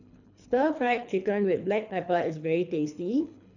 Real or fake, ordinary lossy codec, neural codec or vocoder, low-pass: fake; none; codec, 24 kHz, 3 kbps, HILCodec; 7.2 kHz